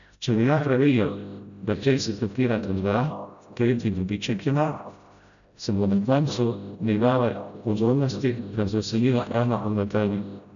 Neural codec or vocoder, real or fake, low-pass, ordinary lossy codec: codec, 16 kHz, 0.5 kbps, FreqCodec, smaller model; fake; 7.2 kHz; none